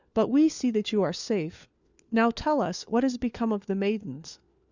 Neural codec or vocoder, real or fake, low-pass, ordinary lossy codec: codec, 16 kHz, 4 kbps, FunCodec, trained on LibriTTS, 50 frames a second; fake; 7.2 kHz; Opus, 64 kbps